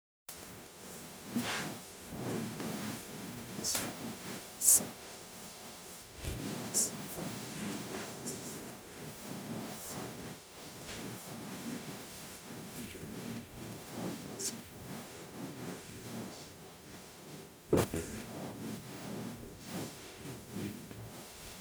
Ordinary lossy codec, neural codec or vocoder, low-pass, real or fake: none; codec, 44.1 kHz, 0.9 kbps, DAC; none; fake